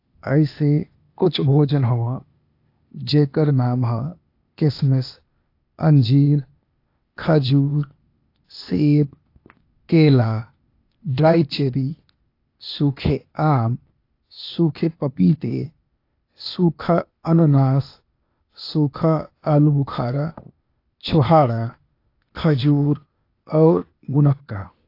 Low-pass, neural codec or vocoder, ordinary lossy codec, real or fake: 5.4 kHz; codec, 16 kHz, 0.8 kbps, ZipCodec; AAC, 32 kbps; fake